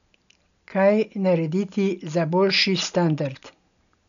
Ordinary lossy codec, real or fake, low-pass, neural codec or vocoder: none; real; 7.2 kHz; none